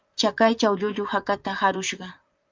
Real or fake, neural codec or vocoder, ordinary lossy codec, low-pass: fake; vocoder, 44.1 kHz, 128 mel bands, Pupu-Vocoder; Opus, 24 kbps; 7.2 kHz